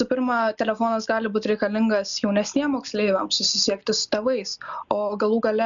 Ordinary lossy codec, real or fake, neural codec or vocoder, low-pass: MP3, 96 kbps; real; none; 7.2 kHz